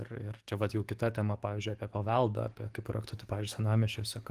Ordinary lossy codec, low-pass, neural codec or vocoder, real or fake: Opus, 24 kbps; 14.4 kHz; codec, 44.1 kHz, 7.8 kbps, DAC; fake